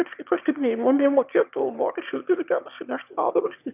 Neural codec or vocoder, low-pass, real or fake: autoencoder, 22.05 kHz, a latent of 192 numbers a frame, VITS, trained on one speaker; 3.6 kHz; fake